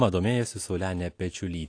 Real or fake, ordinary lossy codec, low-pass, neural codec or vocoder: real; AAC, 48 kbps; 9.9 kHz; none